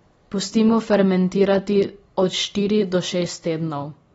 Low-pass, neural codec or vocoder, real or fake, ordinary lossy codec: 10.8 kHz; none; real; AAC, 24 kbps